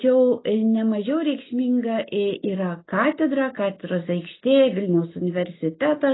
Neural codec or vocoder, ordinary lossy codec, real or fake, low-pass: none; AAC, 16 kbps; real; 7.2 kHz